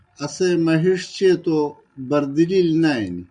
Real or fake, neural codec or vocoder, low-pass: real; none; 9.9 kHz